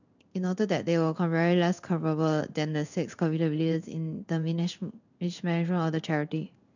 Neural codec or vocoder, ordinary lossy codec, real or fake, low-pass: codec, 16 kHz in and 24 kHz out, 1 kbps, XY-Tokenizer; none; fake; 7.2 kHz